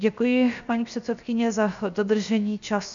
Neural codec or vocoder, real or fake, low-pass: codec, 16 kHz, 0.7 kbps, FocalCodec; fake; 7.2 kHz